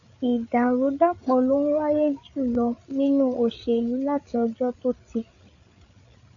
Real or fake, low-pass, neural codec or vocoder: fake; 7.2 kHz; codec, 16 kHz, 8 kbps, FreqCodec, larger model